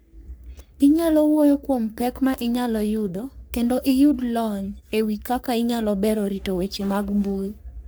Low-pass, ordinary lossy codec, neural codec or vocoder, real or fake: none; none; codec, 44.1 kHz, 3.4 kbps, Pupu-Codec; fake